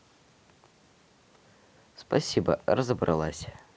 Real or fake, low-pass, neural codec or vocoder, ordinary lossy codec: real; none; none; none